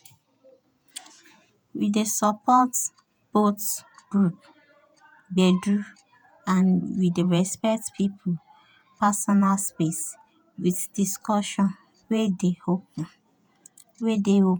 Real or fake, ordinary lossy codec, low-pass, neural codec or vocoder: fake; none; none; vocoder, 48 kHz, 128 mel bands, Vocos